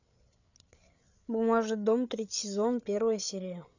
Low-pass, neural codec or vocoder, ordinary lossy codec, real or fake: 7.2 kHz; codec, 16 kHz, 8 kbps, FreqCodec, larger model; none; fake